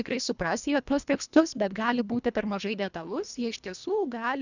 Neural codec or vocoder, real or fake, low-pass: codec, 24 kHz, 1.5 kbps, HILCodec; fake; 7.2 kHz